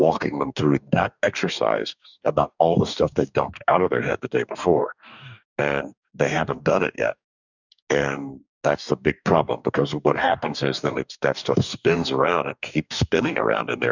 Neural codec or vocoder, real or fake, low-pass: codec, 44.1 kHz, 2.6 kbps, DAC; fake; 7.2 kHz